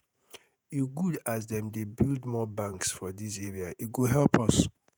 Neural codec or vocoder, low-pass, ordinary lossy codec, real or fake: vocoder, 48 kHz, 128 mel bands, Vocos; none; none; fake